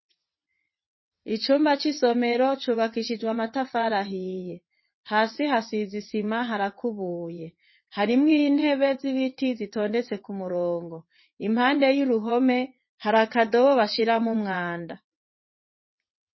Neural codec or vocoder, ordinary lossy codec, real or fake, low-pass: vocoder, 22.05 kHz, 80 mel bands, WaveNeXt; MP3, 24 kbps; fake; 7.2 kHz